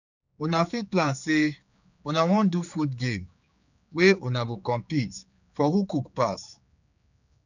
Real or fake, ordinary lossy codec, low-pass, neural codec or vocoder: fake; none; 7.2 kHz; codec, 16 kHz, 4 kbps, X-Codec, HuBERT features, trained on general audio